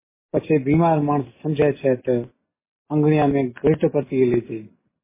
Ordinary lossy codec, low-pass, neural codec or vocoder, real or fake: MP3, 16 kbps; 3.6 kHz; none; real